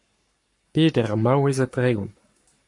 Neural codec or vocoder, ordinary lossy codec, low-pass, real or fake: codec, 44.1 kHz, 7.8 kbps, Pupu-Codec; MP3, 48 kbps; 10.8 kHz; fake